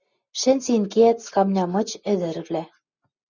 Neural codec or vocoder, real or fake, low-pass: none; real; 7.2 kHz